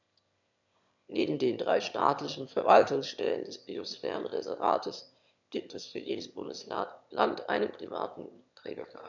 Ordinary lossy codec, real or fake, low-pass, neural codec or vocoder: none; fake; 7.2 kHz; autoencoder, 22.05 kHz, a latent of 192 numbers a frame, VITS, trained on one speaker